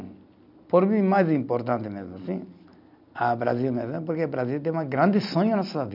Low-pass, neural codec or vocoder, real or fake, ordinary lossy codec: 5.4 kHz; none; real; none